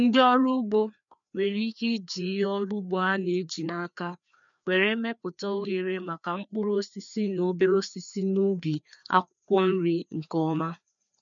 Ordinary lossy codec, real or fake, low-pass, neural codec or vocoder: none; fake; 7.2 kHz; codec, 16 kHz, 2 kbps, FreqCodec, larger model